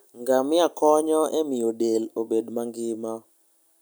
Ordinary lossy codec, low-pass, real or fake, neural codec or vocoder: none; none; real; none